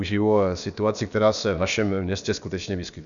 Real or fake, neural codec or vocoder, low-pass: fake; codec, 16 kHz, about 1 kbps, DyCAST, with the encoder's durations; 7.2 kHz